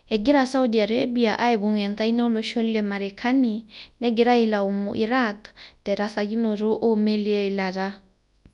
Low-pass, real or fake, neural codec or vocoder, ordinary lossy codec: 10.8 kHz; fake; codec, 24 kHz, 0.9 kbps, WavTokenizer, large speech release; none